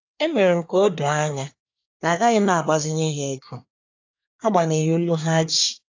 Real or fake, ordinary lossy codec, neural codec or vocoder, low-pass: fake; AAC, 48 kbps; codec, 24 kHz, 1 kbps, SNAC; 7.2 kHz